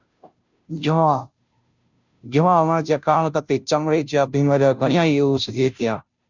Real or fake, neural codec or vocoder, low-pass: fake; codec, 16 kHz, 0.5 kbps, FunCodec, trained on Chinese and English, 25 frames a second; 7.2 kHz